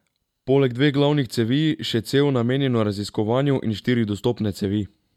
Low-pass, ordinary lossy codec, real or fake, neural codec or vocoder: 19.8 kHz; MP3, 96 kbps; real; none